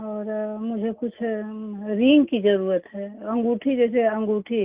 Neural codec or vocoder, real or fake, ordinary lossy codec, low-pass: none; real; Opus, 24 kbps; 3.6 kHz